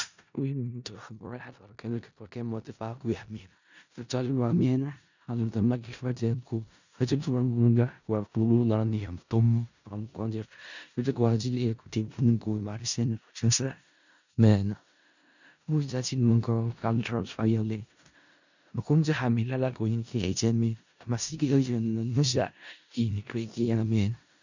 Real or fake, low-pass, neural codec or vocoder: fake; 7.2 kHz; codec, 16 kHz in and 24 kHz out, 0.4 kbps, LongCat-Audio-Codec, four codebook decoder